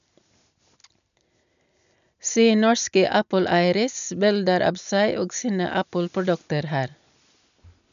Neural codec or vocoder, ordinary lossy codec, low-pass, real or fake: none; none; 7.2 kHz; real